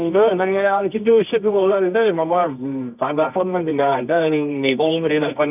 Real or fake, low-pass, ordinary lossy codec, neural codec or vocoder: fake; 3.6 kHz; none; codec, 24 kHz, 0.9 kbps, WavTokenizer, medium music audio release